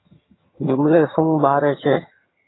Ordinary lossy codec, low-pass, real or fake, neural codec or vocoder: AAC, 16 kbps; 7.2 kHz; fake; vocoder, 22.05 kHz, 80 mel bands, HiFi-GAN